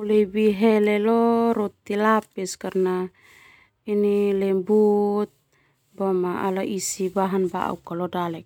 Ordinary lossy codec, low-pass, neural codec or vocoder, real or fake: none; 19.8 kHz; none; real